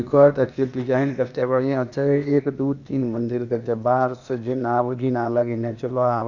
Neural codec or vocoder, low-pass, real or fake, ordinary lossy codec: codec, 16 kHz, 0.8 kbps, ZipCodec; 7.2 kHz; fake; none